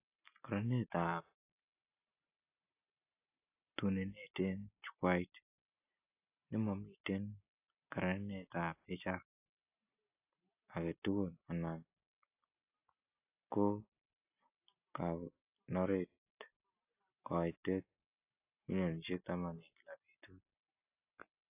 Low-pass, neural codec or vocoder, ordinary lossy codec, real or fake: 3.6 kHz; none; AAC, 32 kbps; real